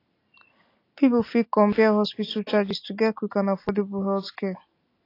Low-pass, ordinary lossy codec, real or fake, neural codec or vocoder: 5.4 kHz; AAC, 32 kbps; real; none